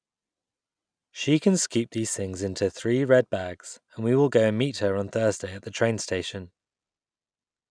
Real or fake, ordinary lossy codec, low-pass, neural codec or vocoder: real; none; 9.9 kHz; none